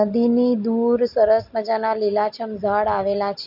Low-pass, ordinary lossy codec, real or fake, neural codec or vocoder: 5.4 kHz; MP3, 48 kbps; real; none